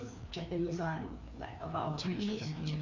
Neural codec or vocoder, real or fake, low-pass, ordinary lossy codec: codec, 16 kHz, 2 kbps, FreqCodec, larger model; fake; 7.2 kHz; none